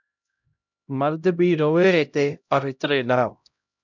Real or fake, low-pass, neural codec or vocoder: fake; 7.2 kHz; codec, 16 kHz, 0.5 kbps, X-Codec, HuBERT features, trained on LibriSpeech